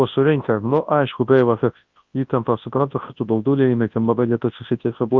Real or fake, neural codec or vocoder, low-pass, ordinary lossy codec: fake; codec, 24 kHz, 0.9 kbps, WavTokenizer, large speech release; 7.2 kHz; Opus, 32 kbps